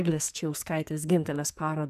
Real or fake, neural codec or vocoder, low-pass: fake; codec, 44.1 kHz, 2.6 kbps, SNAC; 14.4 kHz